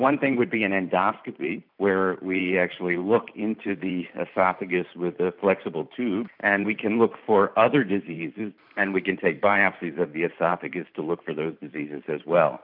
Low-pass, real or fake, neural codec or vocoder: 5.4 kHz; fake; vocoder, 44.1 kHz, 128 mel bands every 512 samples, BigVGAN v2